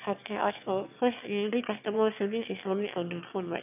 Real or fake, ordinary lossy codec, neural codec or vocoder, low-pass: fake; none; autoencoder, 22.05 kHz, a latent of 192 numbers a frame, VITS, trained on one speaker; 3.6 kHz